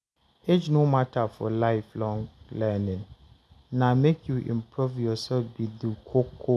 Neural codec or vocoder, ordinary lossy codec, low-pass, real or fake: none; none; none; real